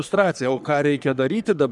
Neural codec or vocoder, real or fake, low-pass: codec, 44.1 kHz, 3.4 kbps, Pupu-Codec; fake; 10.8 kHz